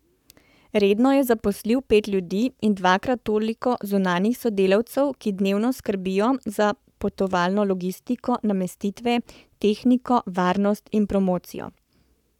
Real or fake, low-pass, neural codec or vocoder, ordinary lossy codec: fake; 19.8 kHz; codec, 44.1 kHz, 7.8 kbps, Pupu-Codec; none